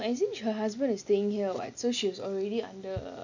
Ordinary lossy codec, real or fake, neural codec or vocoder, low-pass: none; real; none; 7.2 kHz